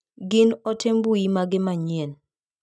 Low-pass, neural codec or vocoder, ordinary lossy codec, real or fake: none; none; none; real